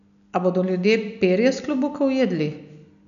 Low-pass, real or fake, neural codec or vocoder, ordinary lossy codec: 7.2 kHz; real; none; none